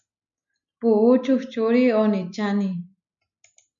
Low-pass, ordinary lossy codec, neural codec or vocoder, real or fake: 7.2 kHz; MP3, 96 kbps; none; real